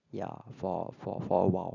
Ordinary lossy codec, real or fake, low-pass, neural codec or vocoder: none; real; 7.2 kHz; none